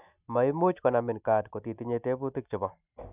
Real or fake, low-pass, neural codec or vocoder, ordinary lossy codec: real; 3.6 kHz; none; none